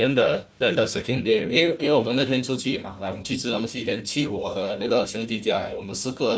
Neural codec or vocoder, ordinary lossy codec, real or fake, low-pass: codec, 16 kHz, 1 kbps, FunCodec, trained on Chinese and English, 50 frames a second; none; fake; none